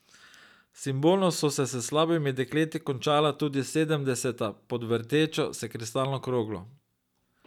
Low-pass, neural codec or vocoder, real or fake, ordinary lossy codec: 19.8 kHz; none; real; none